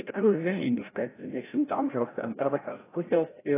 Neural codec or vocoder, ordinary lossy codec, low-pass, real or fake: codec, 16 kHz, 0.5 kbps, FreqCodec, larger model; AAC, 16 kbps; 3.6 kHz; fake